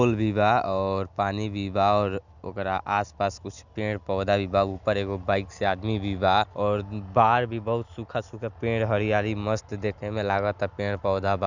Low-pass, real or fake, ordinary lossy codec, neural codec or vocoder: 7.2 kHz; real; none; none